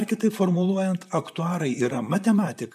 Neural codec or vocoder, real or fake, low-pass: vocoder, 44.1 kHz, 128 mel bands, Pupu-Vocoder; fake; 14.4 kHz